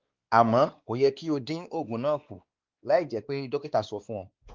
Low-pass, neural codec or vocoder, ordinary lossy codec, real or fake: 7.2 kHz; codec, 16 kHz, 2 kbps, X-Codec, WavLM features, trained on Multilingual LibriSpeech; Opus, 32 kbps; fake